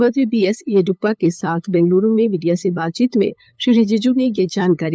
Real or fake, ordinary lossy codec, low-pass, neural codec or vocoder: fake; none; none; codec, 16 kHz, 8 kbps, FunCodec, trained on LibriTTS, 25 frames a second